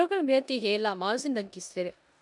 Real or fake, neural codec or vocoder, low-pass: fake; codec, 16 kHz in and 24 kHz out, 0.9 kbps, LongCat-Audio-Codec, four codebook decoder; 10.8 kHz